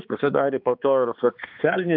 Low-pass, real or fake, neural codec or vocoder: 5.4 kHz; fake; codec, 16 kHz, 2 kbps, X-Codec, HuBERT features, trained on balanced general audio